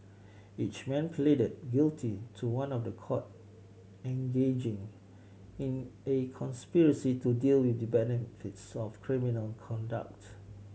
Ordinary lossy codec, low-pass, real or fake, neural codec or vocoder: none; none; real; none